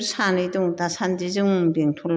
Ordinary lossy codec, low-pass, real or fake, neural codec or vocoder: none; none; real; none